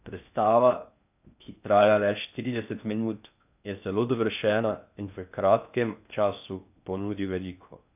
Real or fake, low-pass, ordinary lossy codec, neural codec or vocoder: fake; 3.6 kHz; none; codec, 16 kHz in and 24 kHz out, 0.6 kbps, FocalCodec, streaming, 4096 codes